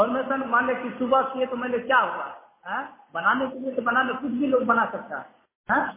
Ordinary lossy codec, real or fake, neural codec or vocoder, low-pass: MP3, 16 kbps; real; none; 3.6 kHz